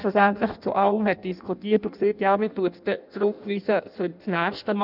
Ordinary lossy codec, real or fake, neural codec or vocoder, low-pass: none; fake; codec, 16 kHz in and 24 kHz out, 0.6 kbps, FireRedTTS-2 codec; 5.4 kHz